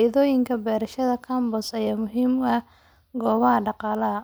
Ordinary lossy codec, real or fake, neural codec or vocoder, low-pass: none; real; none; none